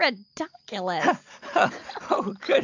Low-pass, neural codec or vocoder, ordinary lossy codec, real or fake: 7.2 kHz; none; AAC, 48 kbps; real